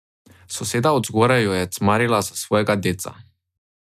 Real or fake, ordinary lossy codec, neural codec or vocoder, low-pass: real; none; none; 14.4 kHz